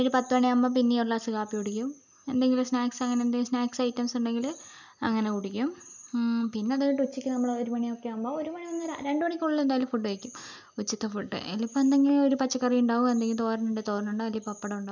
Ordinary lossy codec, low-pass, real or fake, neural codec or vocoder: none; 7.2 kHz; real; none